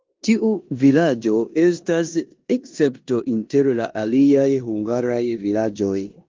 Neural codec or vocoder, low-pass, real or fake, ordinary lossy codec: codec, 16 kHz in and 24 kHz out, 0.9 kbps, LongCat-Audio-Codec, four codebook decoder; 7.2 kHz; fake; Opus, 32 kbps